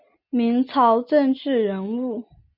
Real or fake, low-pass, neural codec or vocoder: real; 5.4 kHz; none